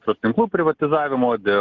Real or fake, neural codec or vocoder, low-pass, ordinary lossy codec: real; none; 7.2 kHz; Opus, 16 kbps